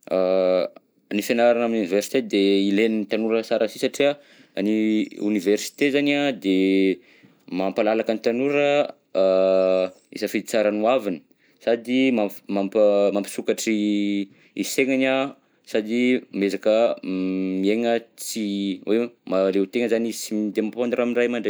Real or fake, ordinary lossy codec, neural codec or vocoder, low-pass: real; none; none; none